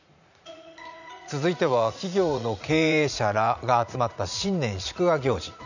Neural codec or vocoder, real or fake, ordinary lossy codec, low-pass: vocoder, 44.1 kHz, 80 mel bands, Vocos; fake; none; 7.2 kHz